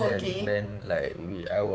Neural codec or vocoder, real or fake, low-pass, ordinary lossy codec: codec, 16 kHz, 4 kbps, X-Codec, HuBERT features, trained on balanced general audio; fake; none; none